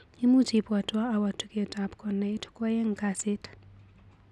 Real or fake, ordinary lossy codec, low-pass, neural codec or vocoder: real; none; none; none